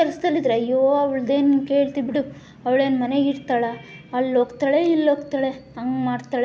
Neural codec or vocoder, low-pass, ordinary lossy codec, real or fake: none; none; none; real